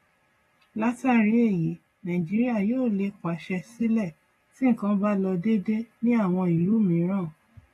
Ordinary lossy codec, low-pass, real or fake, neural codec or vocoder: AAC, 32 kbps; 19.8 kHz; real; none